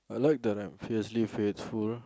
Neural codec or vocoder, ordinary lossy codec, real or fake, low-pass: none; none; real; none